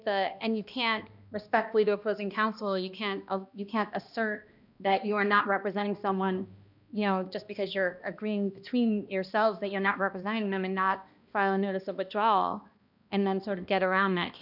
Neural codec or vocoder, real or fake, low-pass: codec, 16 kHz, 1 kbps, X-Codec, HuBERT features, trained on balanced general audio; fake; 5.4 kHz